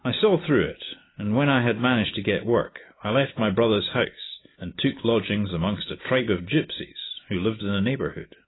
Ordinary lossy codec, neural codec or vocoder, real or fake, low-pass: AAC, 16 kbps; none; real; 7.2 kHz